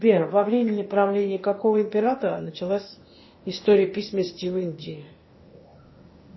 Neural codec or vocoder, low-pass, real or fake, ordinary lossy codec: codec, 16 kHz, 0.8 kbps, ZipCodec; 7.2 kHz; fake; MP3, 24 kbps